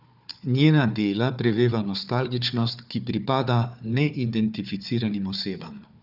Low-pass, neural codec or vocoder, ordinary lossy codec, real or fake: 5.4 kHz; codec, 16 kHz, 4 kbps, FunCodec, trained on Chinese and English, 50 frames a second; none; fake